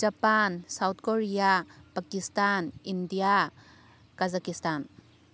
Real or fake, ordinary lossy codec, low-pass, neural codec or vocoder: real; none; none; none